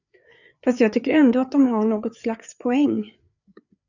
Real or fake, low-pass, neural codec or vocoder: fake; 7.2 kHz; codec, 16 kHz, 4 kbps, FreqCodec, larger model